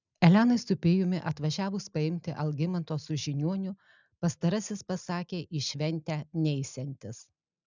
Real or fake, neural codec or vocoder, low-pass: real; none; 7.2 kHz